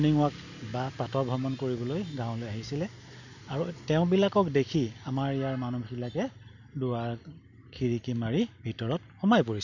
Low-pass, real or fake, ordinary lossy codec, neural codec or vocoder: 7.2 kHz; real; none; none